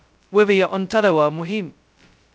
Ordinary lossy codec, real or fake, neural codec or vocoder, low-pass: none; fake; codec, 16 kHz, 0.2 kbps, FocalCodec; none